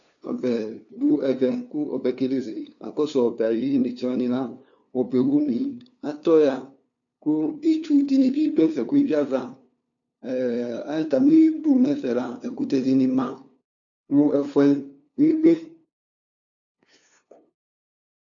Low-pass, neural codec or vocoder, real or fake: 7.2 kHz; codec, 16 kHz, 2 kbps, FunCodec, trained on LibriTTS, 25 frames a second; fake